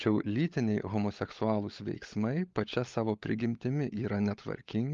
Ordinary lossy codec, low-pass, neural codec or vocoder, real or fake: Opus, 32 kbps; 7.2 kHz; codec, 16 kHz, 16 kbps, FunCodec, trained on LibriTTS, 50 frames a second; fake